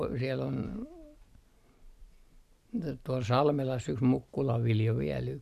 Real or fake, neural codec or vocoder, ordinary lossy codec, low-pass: real; none; none; 14.4 kHz